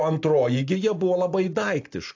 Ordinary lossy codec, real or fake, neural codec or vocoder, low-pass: AAC, 48 kbps; real; none; 7.2 kHz